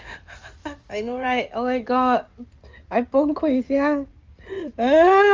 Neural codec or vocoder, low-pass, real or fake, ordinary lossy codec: codec, 16 kHz in and 24 kHz out, 2.2 kbps, FireRedTTS-2 codec; 7.2 kHz; fake; Opus, 32 kbps